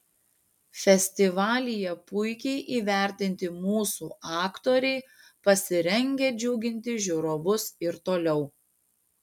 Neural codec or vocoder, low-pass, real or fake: none; 19.8 kHz; real